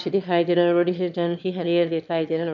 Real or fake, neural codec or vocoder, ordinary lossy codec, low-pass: fake; autoencoder, 22.05 kHz, a latent of 192 numbers a frame, VITS, trained on one speaker; none; 7.2 kHz